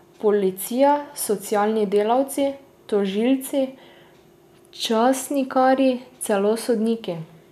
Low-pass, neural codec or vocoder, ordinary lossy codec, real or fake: 14.4 kHz; none; none; real